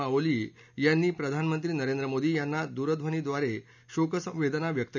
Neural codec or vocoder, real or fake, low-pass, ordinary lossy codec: none; real; 7.2 kHz; none